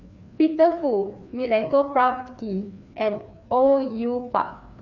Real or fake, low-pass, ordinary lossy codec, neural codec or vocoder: fake; 7.2 kHz; none; codec, 16 kHz, 2 kbps, FreqCodec, larger model